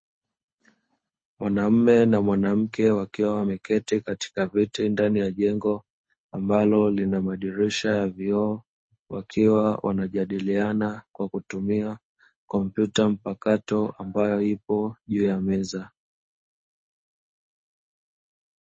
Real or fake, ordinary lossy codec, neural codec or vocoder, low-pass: fake; MP3, 32 kbps; codec, 24 kHz, 6 kbps, HILCodec; 9.9 kHz